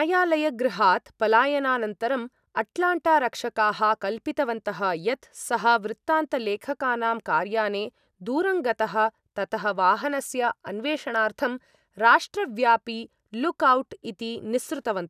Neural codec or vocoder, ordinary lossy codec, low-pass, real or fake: none; none; 14.4 kHz; real